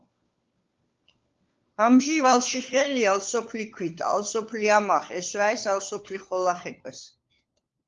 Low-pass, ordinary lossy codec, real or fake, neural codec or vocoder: 7.2 kHz; Opus, 32 kbps; fake; codec, 16 kHz, 4 kbps, FunCodec, trained on LibriTTS, 50 frames a second